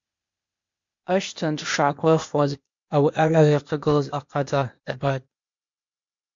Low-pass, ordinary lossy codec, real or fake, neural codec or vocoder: 7.2 kHz; MP3, 48 kbps; fake; codec, 16 kHz, 0.8 kbps, ZipCodec